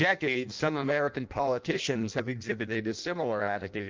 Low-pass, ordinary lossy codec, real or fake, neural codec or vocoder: 7.2 kHz; Opus, 24 kbps; fake; codec, 16 kHz in and 24 kHz out, 0.6 kbps, FireRedTTS-2 codec